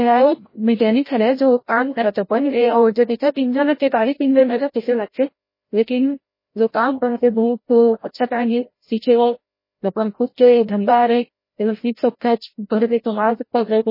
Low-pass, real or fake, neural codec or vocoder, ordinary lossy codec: 5.4 kHz; fake; codec, 16 kHz, 0.5 kbps, FreqCodec, larger model; MP3, 24 kbps